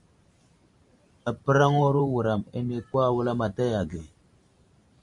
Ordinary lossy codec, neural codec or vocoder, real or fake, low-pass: MP3, 64 kbps; vocoder, 44.1 kHz, 128 mel bands every 512 samples, BigVGAN v2; fake; 10.8 kHz